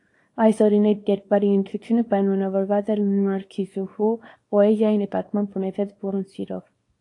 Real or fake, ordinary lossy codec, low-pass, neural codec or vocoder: fake; AAC, 48 kbps; 10.8 kHz; codec, 24 kHz, 0.9 kbps, WavTokenizer, small release